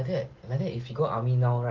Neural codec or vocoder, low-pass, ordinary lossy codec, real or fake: none; 7.2 kHz; Opus, 16 kbps; real